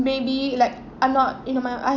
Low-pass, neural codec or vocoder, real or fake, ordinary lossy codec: 7.2 kHz; none; real; none